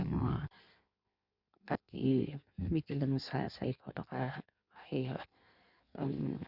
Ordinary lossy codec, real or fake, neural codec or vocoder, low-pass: Opus, 64 kbps; fake; codec, 16 kHz in and 24 kHz out, 1.1 kbps, FireRedTTS-2 codec; 5.4 kHz